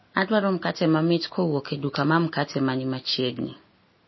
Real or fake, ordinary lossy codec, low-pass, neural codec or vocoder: real; MP3, 24 kbps; 7.2 kHz; none